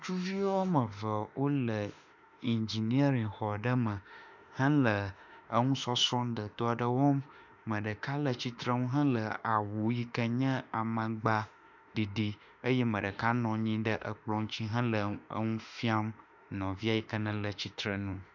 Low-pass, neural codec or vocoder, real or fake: 7.2 kHz; autoencoder, 48 kHz, 32 numbers a frame, DAC-VAE, trained on Japanese speech; fake